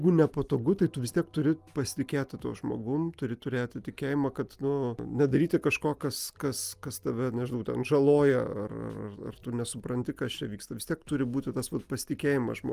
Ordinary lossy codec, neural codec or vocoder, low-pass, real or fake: Opus, 32 kbps; none; 14.4 kHz; real